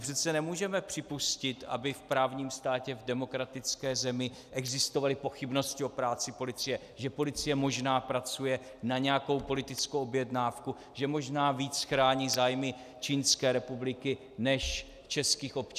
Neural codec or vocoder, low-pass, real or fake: none; 14.4 kHz; real